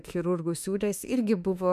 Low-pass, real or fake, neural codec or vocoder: 14.4 kHz; fake; autoencoder, 48 kHz, 32 numbers a frame, DAC-VAE, trained on Japanese speech